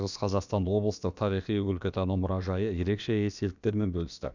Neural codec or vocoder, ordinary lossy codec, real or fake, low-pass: codec, 16 kHz, about 1 kbps, DyCAST, with the encoder's durations; none; fake; 7.2 kHz